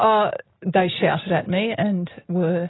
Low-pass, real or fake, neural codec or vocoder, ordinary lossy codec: 7.2 kHz; real; none; AAC, 16 kbps